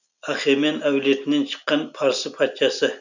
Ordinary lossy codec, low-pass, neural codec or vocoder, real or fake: none; 7.2 kHz; none; real